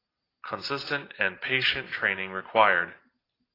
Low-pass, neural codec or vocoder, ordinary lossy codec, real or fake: 5.4 kHz; none; AAC, 24 kbps; real